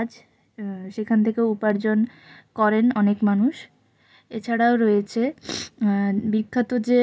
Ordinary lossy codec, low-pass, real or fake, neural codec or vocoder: none; none; real; none